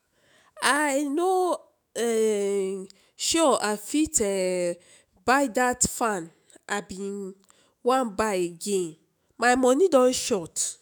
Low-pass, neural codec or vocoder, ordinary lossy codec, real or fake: none; autoencoder, 48 kHz, 128 numbers a frame, DAC-VAE, trained on Japanese speech; none; fake